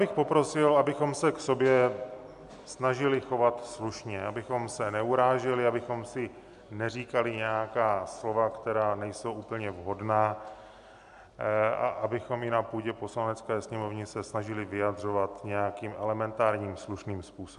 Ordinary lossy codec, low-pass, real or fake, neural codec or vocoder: AAC, 96 kbps; 10.8 kHz; real; none